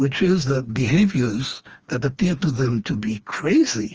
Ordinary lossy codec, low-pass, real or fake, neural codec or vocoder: Opus, 24 kbps; 7.2 kHz; fake; codec, 16 kHz, 2 kbps, FreqCodec, smaller model